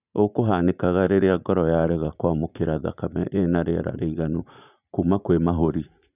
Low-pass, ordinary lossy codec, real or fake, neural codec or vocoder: 3.6 kHz; none; fake; vocoder, 44.1 kHz, 80 mel bands, Vocos